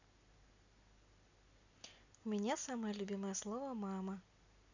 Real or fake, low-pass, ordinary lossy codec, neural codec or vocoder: real; 7.2 kHz; none; none